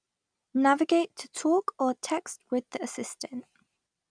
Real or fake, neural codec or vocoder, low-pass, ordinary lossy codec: real; none; 9.9 kHz; none